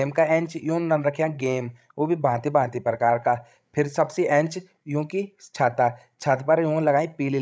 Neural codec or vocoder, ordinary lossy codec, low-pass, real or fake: codec, 16 kHz, 16 kbps, FreqCodec, larger model; none; none; fake